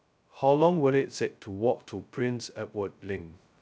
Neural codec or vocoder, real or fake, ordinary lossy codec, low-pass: codec, 16 kHz, 0.2 kbps, FocalCodec; fake; none; none